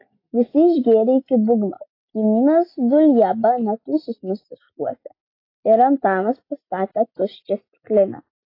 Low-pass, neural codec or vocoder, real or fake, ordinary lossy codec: 5.4 kHz; none; real; AAC, 32 kbps